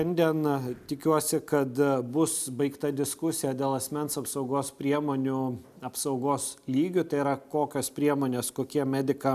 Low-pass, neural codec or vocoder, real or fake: 14.4 kHz; none; real